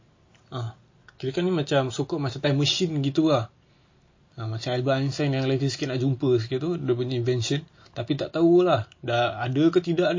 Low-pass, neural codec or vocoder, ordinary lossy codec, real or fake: 7.2 kHz; none; MP3, 32 kbps; real